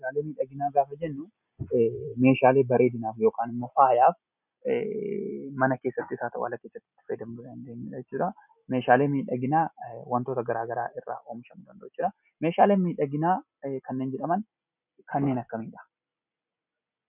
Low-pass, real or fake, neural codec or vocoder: 3.6 kHz; real; none